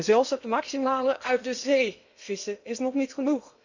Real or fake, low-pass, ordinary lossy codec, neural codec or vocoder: fake; 7.2 kHz; none; codec, 16 kHz in and 24 kHz out, 0.8 kbps, FocalCodec, streaming, 65536 codes